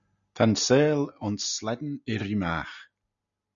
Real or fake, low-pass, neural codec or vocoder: real; 7.2 kHz; none